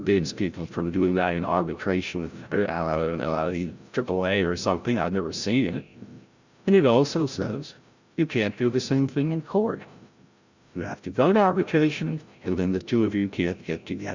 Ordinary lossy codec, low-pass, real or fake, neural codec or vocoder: Opus, 64 kbps; 7.2 kHz; fake; codec, 16 kHz, 0.5 kbps, FreqCodec, larger model